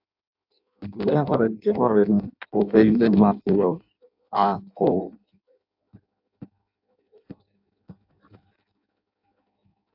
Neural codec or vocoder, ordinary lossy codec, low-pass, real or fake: codec, 16 kHz in and 24 kHz out, 0.6 kbps, FireRedTTS-2 codec; AAC, 48 kbps; 5.4 kHz; fake